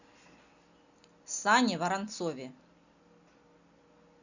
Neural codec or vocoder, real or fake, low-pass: none; real; 7.2 kHz